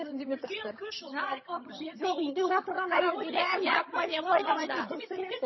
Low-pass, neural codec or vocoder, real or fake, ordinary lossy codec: 7.2 kHz; vocoder, 22.05 kHz, 80 mel bands, HiFi-GAN; fake; MP3, 24 kbps